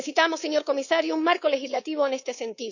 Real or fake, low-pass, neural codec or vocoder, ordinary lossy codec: fake; 7.2 kHz; codec, 24 kHz, 3.1 kbps, DualCodec; none